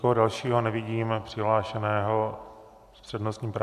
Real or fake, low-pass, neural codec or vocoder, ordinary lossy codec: real; 14.4 kHz; none; MP3, 96 kbps